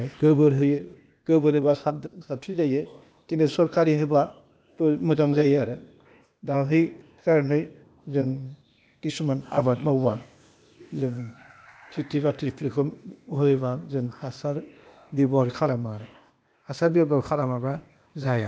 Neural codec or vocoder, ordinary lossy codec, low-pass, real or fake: codec, 16 kHz, 0.8 kbps, ZipCodec; none; none; fake